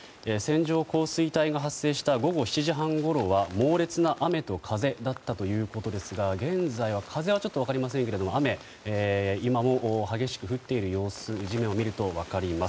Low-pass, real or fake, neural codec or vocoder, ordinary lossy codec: none; real; none; none